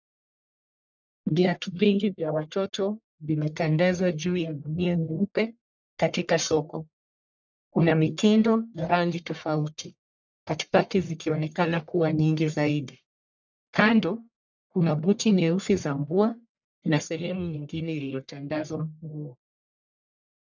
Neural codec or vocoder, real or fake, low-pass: codec, 44.1 kHz, 1.7 kbps, Pupu-Codec; fake; 7.2 kHz